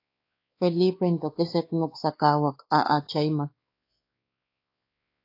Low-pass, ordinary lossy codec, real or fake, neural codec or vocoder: 5.4 kHz; AAC, 32 kbps; fake; codec, 16 kHz, 2 kbps, X-Codec, WavLM features, trained on Multilingual LibriSpeech